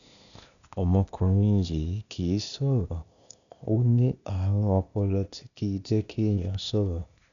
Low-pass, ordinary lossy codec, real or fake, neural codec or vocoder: 7.2 kHz; none; fake; codec, 16 kHz, 0.8 kbps, ZipCodec